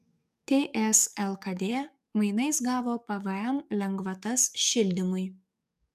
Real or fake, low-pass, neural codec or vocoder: fake; 14.4 kHz; codec, 44.1 kHz, 7.8 kbps, DAC